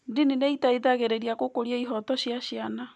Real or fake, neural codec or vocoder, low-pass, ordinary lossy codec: real; none; none; none